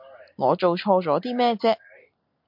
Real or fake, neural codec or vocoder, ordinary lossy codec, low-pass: real; none; MP3, 48 kbps; 5.4 kHz